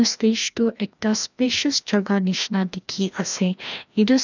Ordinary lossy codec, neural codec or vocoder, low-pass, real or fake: none; codec, 16 kHz, 1 kbps, FreqCodec, larger model; 7.2 kHz; fake